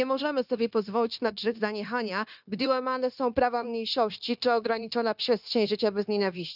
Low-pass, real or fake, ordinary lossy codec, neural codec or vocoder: 5.4 kHz; fake; none; codec, 16 kHz, 0.9 kbps, LongCat-Audio-Codec